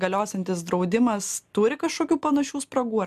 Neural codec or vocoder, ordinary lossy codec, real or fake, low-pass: none; MP3, 96 kbps; real; 14.4 kHz